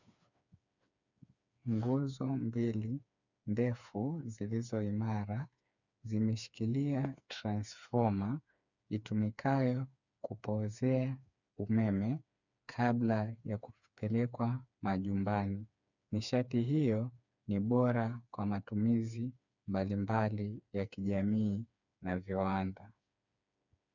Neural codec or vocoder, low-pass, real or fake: codec, 16 kHz, 4 kbps, FreqCodec, smaller model; 7.2 kHz; fake